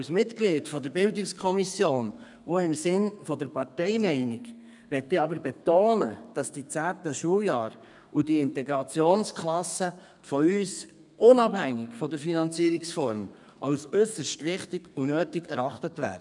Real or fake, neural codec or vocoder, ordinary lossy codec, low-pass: fake; codec, 32 kHz, 1.9 kbps, SNAC; none; 10.8 kHz